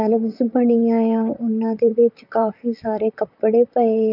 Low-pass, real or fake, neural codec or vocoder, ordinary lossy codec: 5.4 kHz; fake; vocoder, 44.1 kHz, 128 mel bands, Pupu-Vocoder; none